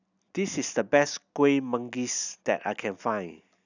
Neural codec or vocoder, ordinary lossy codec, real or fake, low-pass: none; none; real; 7.2 kHz